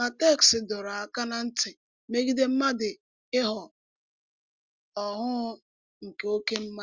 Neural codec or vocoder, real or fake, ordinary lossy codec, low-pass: none; real; Opus, 32 kbps; 7.2 kHz